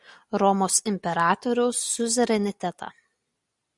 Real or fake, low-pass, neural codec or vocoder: real; 10.8 kHz; none